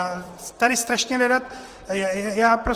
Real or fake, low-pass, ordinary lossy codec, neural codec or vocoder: fake; 14.4 kHz; Opus, 24 kbps; vocoder, 44.1 kHz, 128 mel bands, Pupu-Vocoder